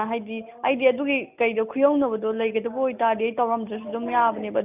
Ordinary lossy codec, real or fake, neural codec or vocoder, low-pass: none; real; none; 3.6 kHz